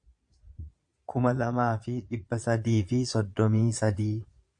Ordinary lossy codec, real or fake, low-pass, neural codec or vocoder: AAC, 64 kbps; fake; 9.9 kHz; vocoder, 22.05 kHz, 80 mel bands, Vocos